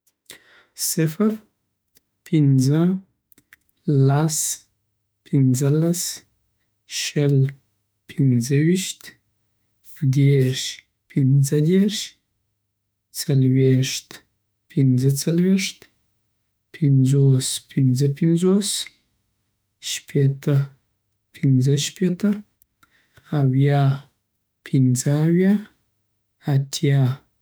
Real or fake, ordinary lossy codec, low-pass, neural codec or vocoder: fake; none; none; autoencoder, 48 kHz, 32 numbers a frame, DAC-VAE, trained on Japanese speech